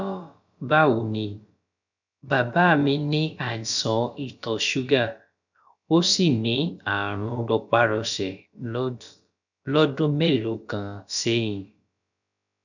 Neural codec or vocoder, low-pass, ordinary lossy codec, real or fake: codec, 16 kHz, about 1 kbps, DyCAST, with the encoder's durations; 7.2 kHz; none; fake